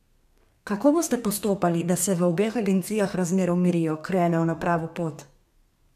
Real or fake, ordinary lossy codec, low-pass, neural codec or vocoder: fake; none; 14.4 kHz; codec, 32 kHz, 1.9 kbps, SNAC